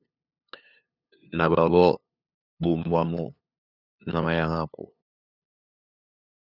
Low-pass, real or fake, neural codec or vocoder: 5.4 kHz; fake; codec, 16 kHz, 2 kbps, FunCodec, trained on LibriTTS, 25 frames a second